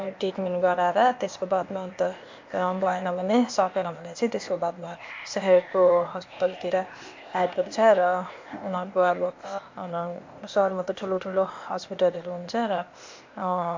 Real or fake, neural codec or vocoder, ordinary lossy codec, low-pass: fake; codec, 16 kHz, 0.8 kbps, ZipCodec; MP3, 48 kbps; 7.2 kHz